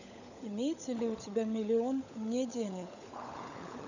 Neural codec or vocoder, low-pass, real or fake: codec, 16 kHz, 16 kbps, FunCodec, trained on LibriTTS, 50 frames a second; 7.2 kHz; fake